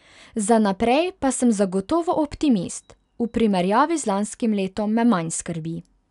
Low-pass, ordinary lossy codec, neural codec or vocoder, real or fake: 10.8 kHz; none; none; real